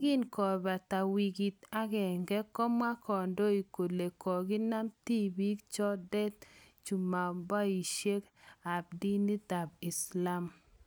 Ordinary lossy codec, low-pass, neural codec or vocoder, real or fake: none; none; none; real